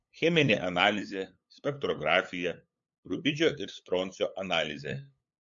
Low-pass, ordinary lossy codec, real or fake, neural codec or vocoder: 7.2 kHz; MP3, 48 kbps; fake; codec, 16 kHz, 8 kbps, FunCodec, trained on LibriTTS, 25 frames a second